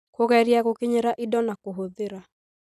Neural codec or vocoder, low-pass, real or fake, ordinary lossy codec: none; 14.4 kHz; real; none